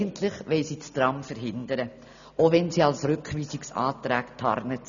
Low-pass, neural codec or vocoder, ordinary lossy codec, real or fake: 7.2 kHz; none; none; real